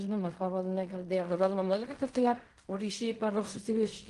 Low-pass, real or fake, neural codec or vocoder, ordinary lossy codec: 10.8 kHz; fake; codec, 16 kHz in and 24 kHz out, 0.4 kbps, LongCat-Audio-Codec, fine tuned four codebook decoder; Opus, 24 kbps